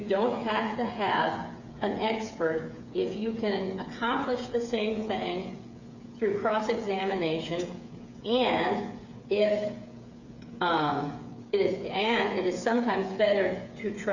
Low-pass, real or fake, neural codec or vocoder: 7.2 kHz; fake; codec, 16 kHz, 8 kbps, FreqCodec, smaller model